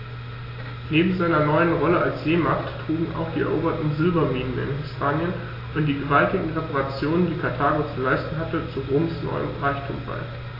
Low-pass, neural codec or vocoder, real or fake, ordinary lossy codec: 5.4 kHz; none; real; AAC, 24 kbps